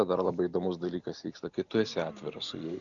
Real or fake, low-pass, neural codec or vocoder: real; 7.2 kHz; none